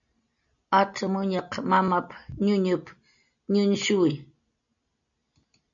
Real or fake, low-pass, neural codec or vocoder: real; 7.2 kHz; none